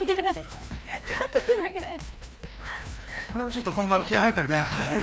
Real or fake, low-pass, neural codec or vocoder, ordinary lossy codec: fake; none; codec, 16 kHz, 1 kbps, FreqCodec, larger model; none